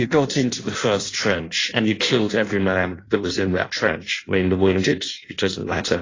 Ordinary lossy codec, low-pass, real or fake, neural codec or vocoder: AAC, 32 kbps; 7.2 kHz; fake; codec, 16 kHz in and 24 kHz out, 0.6 kbps, FireRedTTS-2 codec